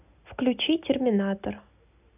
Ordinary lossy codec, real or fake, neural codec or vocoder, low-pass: none; real; none; 3.6 kHz